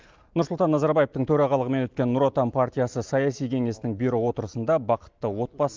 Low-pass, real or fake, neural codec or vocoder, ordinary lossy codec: 7.2 kHz; real; none; Opus, 24 kbps